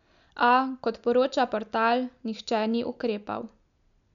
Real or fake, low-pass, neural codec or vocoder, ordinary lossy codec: real; 7.2 kHz; none; none